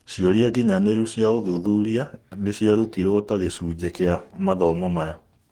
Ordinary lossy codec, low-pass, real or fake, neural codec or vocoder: Opus, 24 kbps; 19.8 kHz; fake; codec, 44.1 kHz, 2.6 kbps, DAC